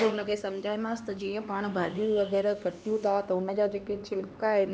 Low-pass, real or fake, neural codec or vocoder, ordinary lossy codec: none; fake; codec, 16 kHz, 2 kbps, X-Codec, HuBERT features, trained on LibriSpeech; none